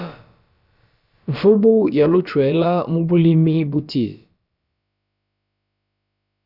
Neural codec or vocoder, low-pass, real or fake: codec, 16 kHz, about 1 kbps, DyCAST, with the encoder's durations; 5.4 kHz; fake